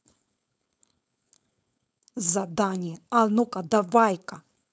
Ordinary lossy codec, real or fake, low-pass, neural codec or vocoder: none; fake; none; codec, 16 kHz, 4.8 kbps, FACodec